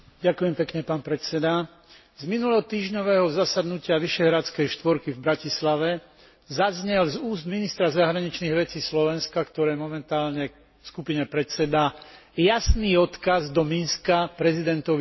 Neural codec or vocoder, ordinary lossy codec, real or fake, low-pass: none; MP3, 24 kbps; real; 7.2 kHz